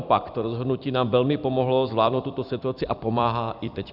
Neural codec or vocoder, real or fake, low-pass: vocoder, 44.1 kHz, 128 mel bands every 256 samples, BigVGAN v2; fake; 5.4 kHz